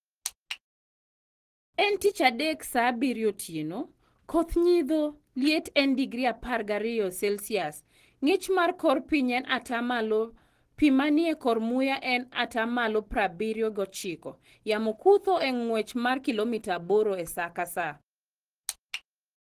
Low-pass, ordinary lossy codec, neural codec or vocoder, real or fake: 14.4 kHz; Opus, 16 kbps; none; real